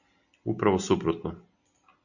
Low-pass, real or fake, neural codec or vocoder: 7.2 kHz; real; none